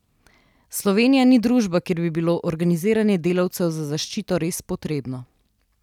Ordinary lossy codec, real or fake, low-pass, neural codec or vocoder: none; real; 19.8 kHz; none